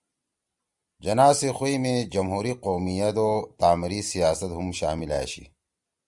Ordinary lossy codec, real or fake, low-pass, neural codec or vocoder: Opus, 64 kbps; real; 10.8 kHz; none